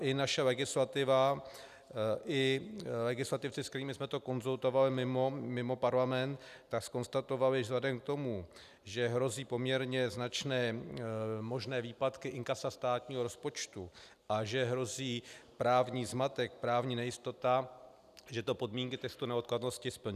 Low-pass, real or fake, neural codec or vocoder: 14.4 kHz; real; none